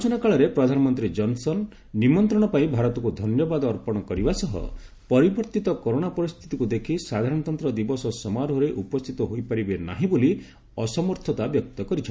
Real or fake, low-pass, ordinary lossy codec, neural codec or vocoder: real; none; none; none